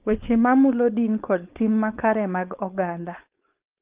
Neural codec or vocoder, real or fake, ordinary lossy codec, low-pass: codec, 16 kHz, 4.8 kbps, FACodec; fake; Opus, 32 kbps; 3.6 kHz